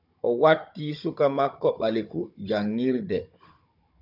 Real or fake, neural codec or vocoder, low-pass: fake; codec, 16 kHz, 16 kbps, FunCodec, trained on Chinese and English, 50 frames a second; 5.4 kHz